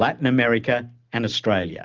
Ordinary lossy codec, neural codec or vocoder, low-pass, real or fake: Opus, 24 kbps; none; 7.2 kHz; real